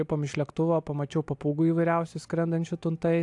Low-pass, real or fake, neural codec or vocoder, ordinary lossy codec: 10.8 kHz; real; none; AAC, 64 kbps